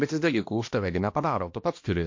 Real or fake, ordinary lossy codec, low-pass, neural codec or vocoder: fake; MP3, 48 kbps; 7.2 kHz; codec, 16 kHz, 1 kbps, X-Codec, HuBERT features, trained on balanced general audio